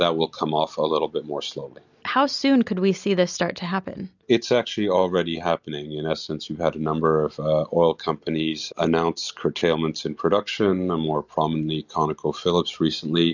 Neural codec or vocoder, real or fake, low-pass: none; real; 7.2 kHz